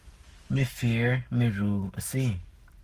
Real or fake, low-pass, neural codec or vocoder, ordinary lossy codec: fake; 14.4 kHz; codec, 44.1 kHz, 7.8 kbps, Pupu-Codec; Opus, 24 kbps